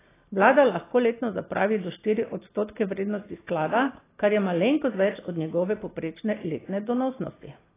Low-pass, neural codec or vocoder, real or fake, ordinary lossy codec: 3.6 kHz; none; real; AAC, 16 kbps